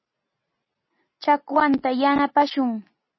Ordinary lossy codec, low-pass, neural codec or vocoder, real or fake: MP3, 24 kbps; 7.2 kHz; none; real